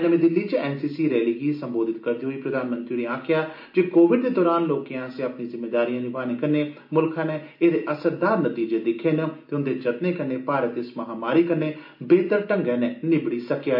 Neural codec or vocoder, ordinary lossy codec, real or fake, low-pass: none; MP3, 32 kbps; real; 5.4 kHz